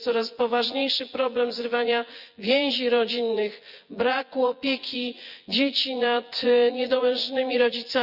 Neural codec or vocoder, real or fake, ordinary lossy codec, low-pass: vocoder, 24 kHz, 100 mel bands, Vocos; fake; Opus, 64 kbps; 5.4 kHz